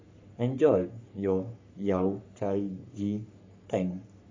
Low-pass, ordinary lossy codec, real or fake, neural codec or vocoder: 7.2 kHz; MP3, 64 kbps; fake; codec, 44.1 kHz, 3.4 kbps, Pupu-Codec